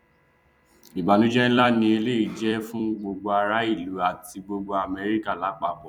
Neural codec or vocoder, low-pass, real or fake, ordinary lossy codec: vocoder, 44.1 kHz, 128 mel bands every 256 samples, BigVGAN v2; 19.8 kHz; fake; none